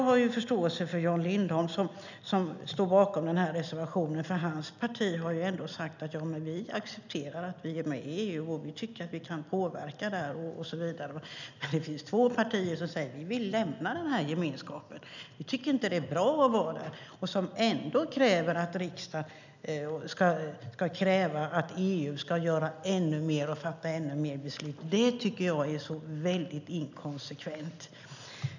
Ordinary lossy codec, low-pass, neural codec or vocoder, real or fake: none; 7.2 kHz; none; real